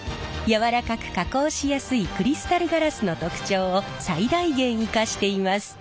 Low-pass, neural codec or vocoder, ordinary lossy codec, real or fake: none; none; none; real